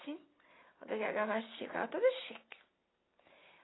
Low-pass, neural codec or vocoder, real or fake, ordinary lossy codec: 7.2 kHz; none; real; AAC, 16 kbps